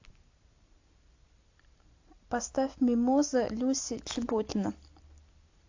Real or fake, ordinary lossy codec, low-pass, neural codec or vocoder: real; AAC, 48 kbps; 7.2 kHz; none